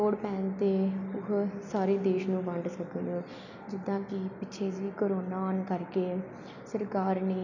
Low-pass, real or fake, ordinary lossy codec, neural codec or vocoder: 7.2 kHz; real; none; none